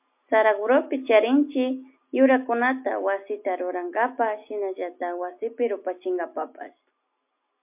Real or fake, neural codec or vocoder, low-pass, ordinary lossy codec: real; none; 3.6 kHz; MP3, 32 kbps